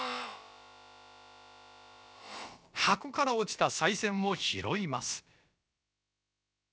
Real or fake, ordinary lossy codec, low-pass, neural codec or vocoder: fake; none; none; codec, 16 kHz, about 1 kbps, DyCAST, with the encoder's durations